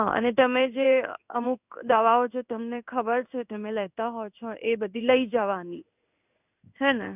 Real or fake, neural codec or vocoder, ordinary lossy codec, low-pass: fake; codec, 16 kHz in and 24 kHz out, 1 kbps, XY-Tokenizer; none; 3.6 kHz